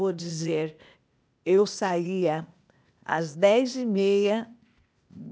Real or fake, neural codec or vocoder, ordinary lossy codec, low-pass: fake; codec, 16 kHz, 0.8 kbps, ZipCodec; none; none